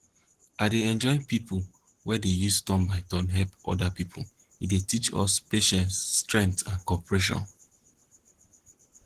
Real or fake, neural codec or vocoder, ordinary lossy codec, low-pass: fake; codec, 44.1 kHz, 7.8 kbps, Pupu-Codec; Opus, 16 kbps; 14.4 kHz